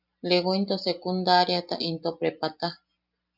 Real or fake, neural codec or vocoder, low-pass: real; none; 5.4 kHz